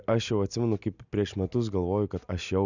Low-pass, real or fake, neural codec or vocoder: 7.2 kHz; real; none